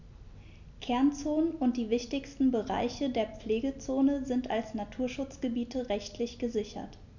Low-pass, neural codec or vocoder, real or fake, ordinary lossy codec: 7.2 kHz; none; real; none